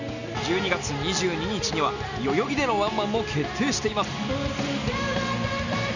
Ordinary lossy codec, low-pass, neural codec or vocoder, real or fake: none; 7.2 kHz; none; real